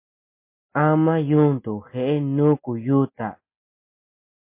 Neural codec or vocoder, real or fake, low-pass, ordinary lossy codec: none; real; 3.6 kHz; MP3, 24 kbps